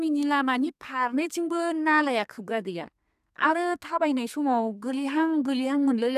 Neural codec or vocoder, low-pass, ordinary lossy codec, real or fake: codec, 32 kHz, 1.9 kbps, SNAC; 14.4 kHz; none; fake